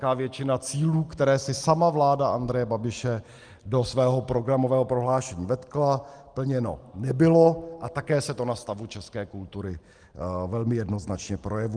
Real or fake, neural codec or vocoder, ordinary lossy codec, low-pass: real; none; Opus, 24 kbps; 9.9 kHz